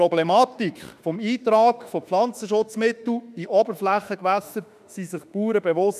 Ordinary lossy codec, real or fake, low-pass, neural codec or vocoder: none; fake; 14.4 kHz; autoencoder, 48 kHz, 32 numbers a frame, DAC-VAE, trained on Japanese speech